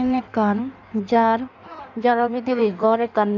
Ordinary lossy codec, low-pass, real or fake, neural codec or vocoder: none; 7.2 kHz; fake; codec, 16 kHz in and 24 kHz out, 1.1 kbps, FireRedTTS-2 codec